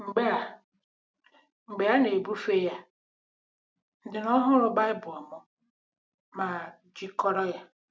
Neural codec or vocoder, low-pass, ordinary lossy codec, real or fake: none; none; none; real